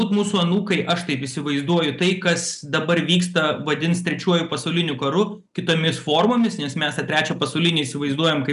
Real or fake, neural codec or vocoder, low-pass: real; none; 10.8 kHz